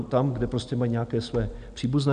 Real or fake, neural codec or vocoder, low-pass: real; none; 9.9 kHz